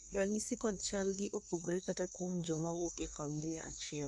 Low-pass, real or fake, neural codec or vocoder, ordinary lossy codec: none; fake; codec, 24 kHz, 1 kbps, SNAC; none